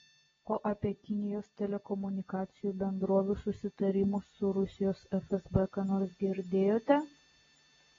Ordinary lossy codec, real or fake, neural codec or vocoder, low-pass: AAC, 24 kbps; real; none; 7.2 kHz